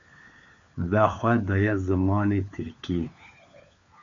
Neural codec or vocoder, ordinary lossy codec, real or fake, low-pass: codec, 16 kHz, 4 kbps, FunCodec, trained on LibriTTS, 50 frames a second; AAC, 64 kbps; fake; 7.2 kHz